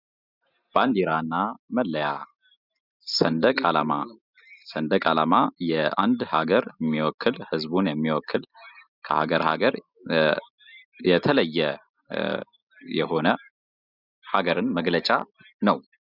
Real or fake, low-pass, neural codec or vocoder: real; 5.4 kHz; none